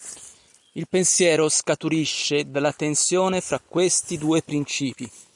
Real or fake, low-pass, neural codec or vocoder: fake; 10.8 kHz; vocoder, 44.1 kHz, 128 mel bands every 256 samples, BigVGAN v2